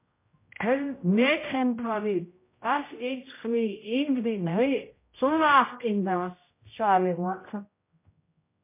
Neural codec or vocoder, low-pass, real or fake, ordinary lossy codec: codec, 16 kHz, 0.5 kbps, X-Codec, HuBERT features, trained on general audio; 3.6 kHz; fake; MP3, 24 kbps